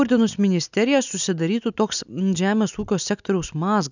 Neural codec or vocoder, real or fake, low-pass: none; real; 7.2 kHz